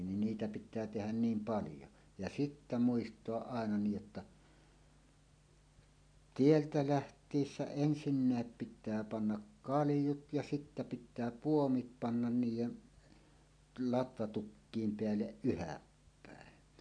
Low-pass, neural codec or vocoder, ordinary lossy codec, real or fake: 9.9 kHz; none; none; real